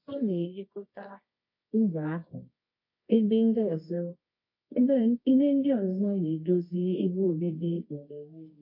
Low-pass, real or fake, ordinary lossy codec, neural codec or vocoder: 5.4 kHz; fake; MP3, 32 kbps; codec, 24 kHz, 0.9 kbps, WavTokenizer, medium music audio release